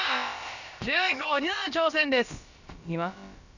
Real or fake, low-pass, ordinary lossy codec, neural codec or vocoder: fake; 7.2 kHz; none; codec, 16 kHz, about 1 kbps, DyCAST, with the encoder's durations